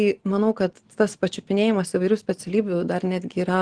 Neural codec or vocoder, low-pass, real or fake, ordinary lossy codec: none; 9.9 kHz; real; Opus, 16 kbps